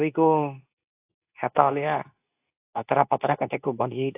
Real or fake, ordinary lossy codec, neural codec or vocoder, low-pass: fake; AAC, 32 kbps; codec, 24 kHz, 0.9 kbps, WavTokenizer, medium speech release version 2; 3.6 kHz